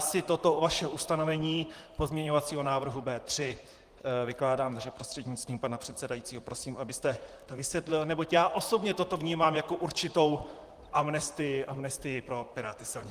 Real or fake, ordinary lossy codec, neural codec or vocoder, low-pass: fake; Opus, 24 kbps; vocoder, 44.1 kHz, 128 mel bands, Pupu-Vocoder; 14.4 kHz